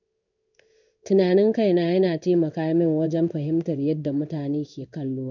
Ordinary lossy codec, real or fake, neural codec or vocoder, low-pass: none; fake; codec, 16 kHz in and 24 kHz out, 1 kbps, XY-Tokenizer; 7.2 kHz